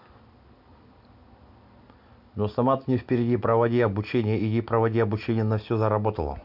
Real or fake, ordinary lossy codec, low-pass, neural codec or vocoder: real; none; 5.4 kHz; none